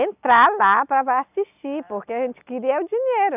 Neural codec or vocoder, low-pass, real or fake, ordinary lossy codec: none; 3.6 kHz; real; none